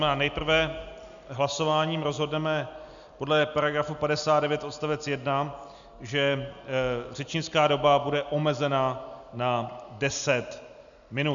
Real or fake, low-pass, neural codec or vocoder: real; 7.2 kHz; none